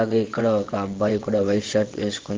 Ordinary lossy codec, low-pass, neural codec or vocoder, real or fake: Opus, 16 kbps; 7.2 kHz; vocoder, 22.05 kHz, 80 mel bands, WaveNeXt; fake